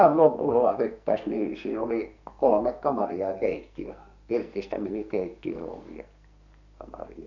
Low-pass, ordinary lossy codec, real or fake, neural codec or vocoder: 7.2 kHz; none; fake; codec, 44.1 kHz, 2.6 kbps, SNAC